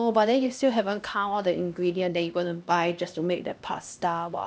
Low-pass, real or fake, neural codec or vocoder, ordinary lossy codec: none; fake; codec, 16 kHz, 1 kbps, X-Codec, HuBERT features, trained on LibriSpeech; none